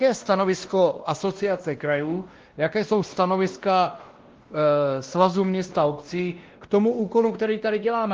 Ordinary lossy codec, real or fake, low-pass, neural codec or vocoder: Opus, 16 kbps; fake; 7.2 kHz; codec, 16 kHz, 1 kbps, X-Codec, WavLM features, trained on Multilingual LibriSpeech